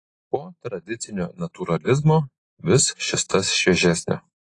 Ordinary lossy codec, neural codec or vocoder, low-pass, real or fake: AAC, 32 kbps; none; 10.8 kHz; real